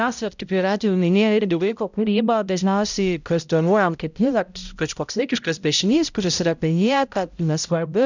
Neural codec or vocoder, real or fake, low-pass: codec, 16 kHz, 0.5 kbps, X-Codec, HuBERT features, trained on balanced general audio; fake; 7.2 kHz